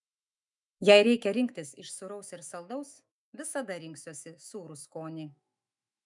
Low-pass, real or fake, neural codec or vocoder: 10.8 kHz; fake; autoencoder, 48 kHz, 128 numbers a frame, DAC-VAE, trained on Japanese speech